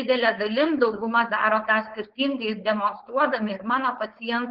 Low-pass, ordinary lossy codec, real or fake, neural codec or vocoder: 5.4 kHz; Opus, 16 kbps; fake; codec, 16 kHz, 4.8 kbps, FACodec